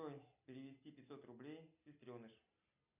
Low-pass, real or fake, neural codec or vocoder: 3.6 kHz; real; none